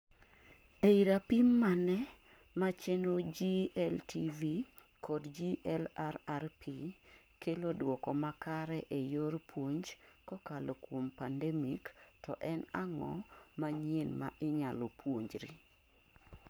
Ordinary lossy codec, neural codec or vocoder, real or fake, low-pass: none; codec, 44.1 kHz, 7.8 kbps, Pupu-Codec; fake; none